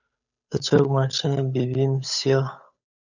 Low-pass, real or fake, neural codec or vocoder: 7.2 kHz; fake; codec, 16 kHz, 8 kbps, FunCodec, trained on Chinese and English, 25 frames a second